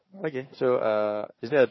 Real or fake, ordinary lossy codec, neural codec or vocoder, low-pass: fake; MP3, 24 kbps; codec, 16 kHz, 4 kbps, FunCodec, trained on Chinese and English, 50 frames a second; 7.2 kHz